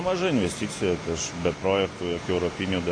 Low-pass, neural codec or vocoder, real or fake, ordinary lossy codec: 9.9 kHz; none; real; AAC, 32 kbps